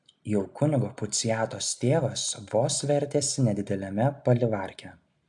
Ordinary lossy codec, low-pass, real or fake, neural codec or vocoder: AAC, 64 kbps; 10.8 kHz; real; none